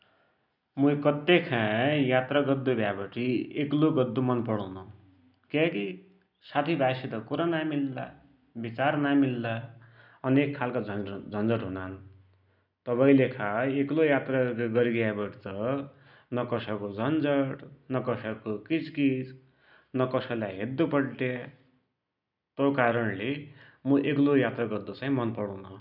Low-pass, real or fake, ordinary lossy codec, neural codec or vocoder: 5.4 kHz; real; none; none